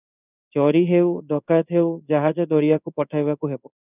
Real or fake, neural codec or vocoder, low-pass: fake; codec, 16 kHz in and 24 kHz out, 1 kbps, XY-Tokenizer; 3.6 kHz